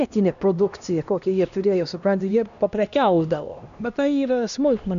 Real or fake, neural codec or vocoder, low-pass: fake; codec, 16 kHz, 1 kbps, X-Codec, HuBERT features, trained on LibriSpeech; 7.2 kHz